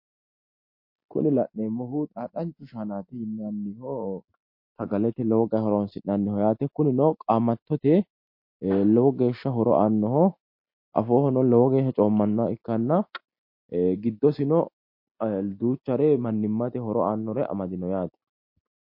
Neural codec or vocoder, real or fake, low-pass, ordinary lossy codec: none; real; 5.4 kHz; MP3, 32 kbps